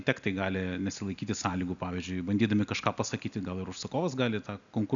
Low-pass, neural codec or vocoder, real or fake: 7.2 kHz; none; real